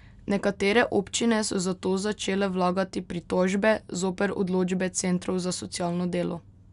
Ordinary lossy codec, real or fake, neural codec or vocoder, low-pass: none; real; none; 10.8 kHz